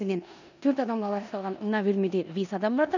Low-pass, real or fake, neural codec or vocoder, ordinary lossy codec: 7.2 kHz; fake; codec, 16 kHz in and 24 kHz out, 0.9 kbps, LongCat-Audio-Codec, four codebook decoder; none